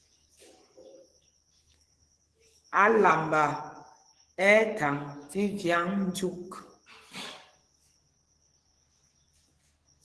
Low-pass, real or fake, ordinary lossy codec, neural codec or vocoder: 10.8 kHz; fake; Opus, 16 kbps; codec, 44.1 kHz, 7.8 kbps, DAC